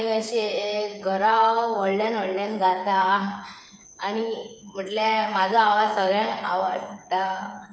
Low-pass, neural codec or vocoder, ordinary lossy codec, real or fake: none; codec, 16 kHz, 8 kbps, FreqCodec, smaller model; none; fake